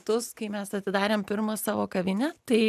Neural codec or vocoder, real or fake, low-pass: none; real; 14.4 kHz